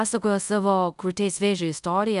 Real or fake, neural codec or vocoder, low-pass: fake; codec, 24 kHz, 0.5 kbps, DualCodec; 10.8 kHz